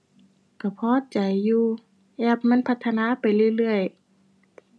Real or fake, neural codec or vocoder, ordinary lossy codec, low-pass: real; none; none; none